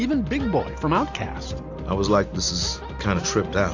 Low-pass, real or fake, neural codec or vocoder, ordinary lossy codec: 7.2 kHz; real; none; AAC, 48 kbps